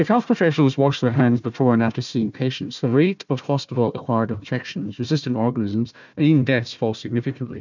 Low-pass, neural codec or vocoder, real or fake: 7.2 kHz; codec, 16 kHz, 1 kbps, FunCodec, trained on Chinese and English, 50 frames a second; fake